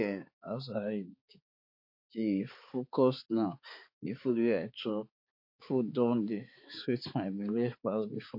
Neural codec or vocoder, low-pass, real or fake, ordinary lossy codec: codec, 16 kHz, 4 kbps, X-Codec, HuBERT features, trained on balanced general audio; 5.4 kHz; fake; MP3, 32 kbps